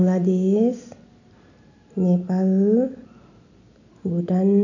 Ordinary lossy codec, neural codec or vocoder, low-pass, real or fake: none; none; 7.2 kHz; real